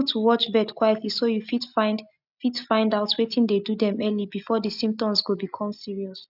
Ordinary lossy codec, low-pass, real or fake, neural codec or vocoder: none; 5.4 kHz; real; none